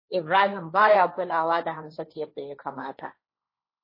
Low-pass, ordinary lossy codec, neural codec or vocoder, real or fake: 5.4 kHz; MP3, 24 kbps; codec, 16 kHz, 1.1 kbps, Voila-Tokenizer; fake